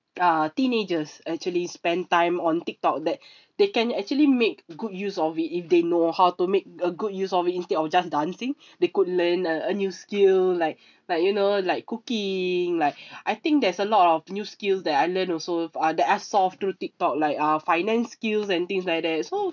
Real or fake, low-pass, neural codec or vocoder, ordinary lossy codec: real; 7.2 kHz; none; none